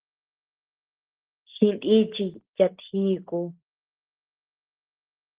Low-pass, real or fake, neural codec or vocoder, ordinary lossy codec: 3.6 kHz; real; none; Opus, 16 kbps